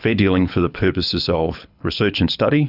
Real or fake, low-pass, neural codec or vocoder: fake; 5.4 kHz; codec, 24 kHz, 6 kbps, HILCodec